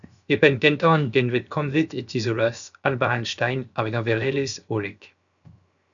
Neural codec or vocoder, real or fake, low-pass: codec, 16 kHz, 0.7 kbps, FocalCodec; fake; 7.2 kHz